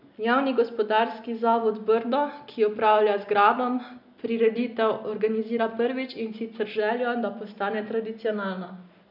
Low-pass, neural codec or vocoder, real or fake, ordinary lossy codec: 5.4 kHz; none; real; none